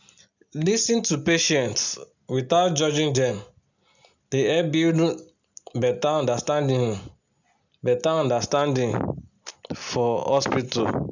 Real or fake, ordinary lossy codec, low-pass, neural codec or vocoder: real; none; 7.2 kHz; none